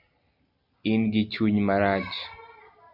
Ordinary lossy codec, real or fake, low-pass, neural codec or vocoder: MP3, 48 kbps; real; 5.4 kHz; none